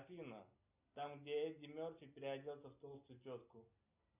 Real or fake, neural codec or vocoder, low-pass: real; none; 3.6 kHz